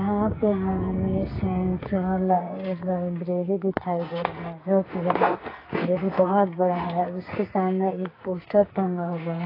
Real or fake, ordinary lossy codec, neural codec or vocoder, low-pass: fake; AAC, 24 kbps; codec, 44.1 kHz, 2.6 kbps, SNAC; 5.4 kHz